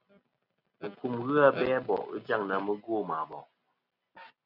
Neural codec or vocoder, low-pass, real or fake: none; 5.4 kHz; real